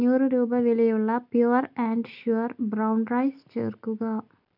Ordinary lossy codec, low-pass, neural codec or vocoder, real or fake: none; 5.4 kHz; none; real